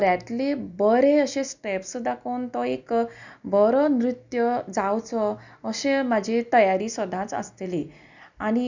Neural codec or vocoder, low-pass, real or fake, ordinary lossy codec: none; 7.2 kHz; real; none